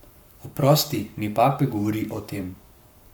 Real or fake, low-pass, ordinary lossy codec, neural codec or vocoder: fake; none; none; codec, 44.1 kHz, 7.8 kbps, Pupu-Codec